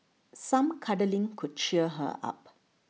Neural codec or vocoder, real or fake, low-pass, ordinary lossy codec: none; real; none; none